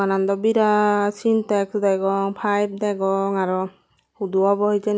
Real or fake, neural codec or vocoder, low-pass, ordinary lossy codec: real; none; none; none